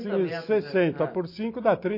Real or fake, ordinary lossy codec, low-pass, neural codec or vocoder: real; MP3, 32 kbps; 5.4 kHz; none